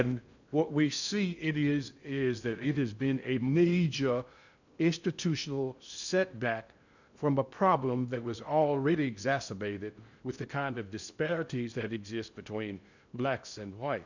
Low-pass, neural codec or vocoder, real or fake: 7.2 kHz; codec, 16 kHz in and 24 kHz out, 0.6 kbps, FocalCodec, streaming, 2048 codes; fake